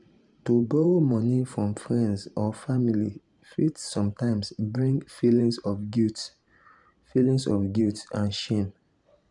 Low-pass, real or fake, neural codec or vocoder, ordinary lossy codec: 10.8 kHz; fake; vocoder, 44.1 kHz, 128 mel bands every 512 samples, BigVGAN v2; none